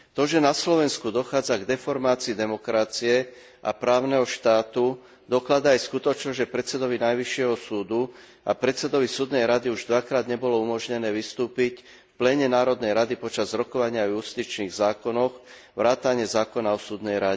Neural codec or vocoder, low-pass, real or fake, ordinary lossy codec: none; none; real; none